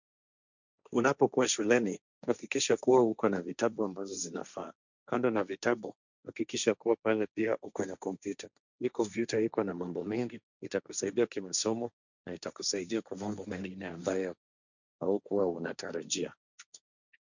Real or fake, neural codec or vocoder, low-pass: fake; codec, 16 kHz, 1.1 kbps, Voila-Tokenizer; 7.2 kHz